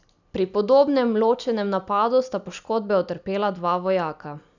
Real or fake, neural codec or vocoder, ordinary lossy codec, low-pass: real; none; none; 7.2 kHz